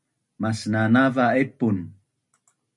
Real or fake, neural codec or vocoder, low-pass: real; none; 10.8 kHz